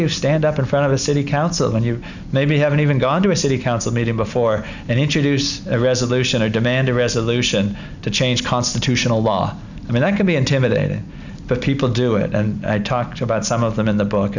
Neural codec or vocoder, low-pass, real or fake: none; 7.2 kHz; real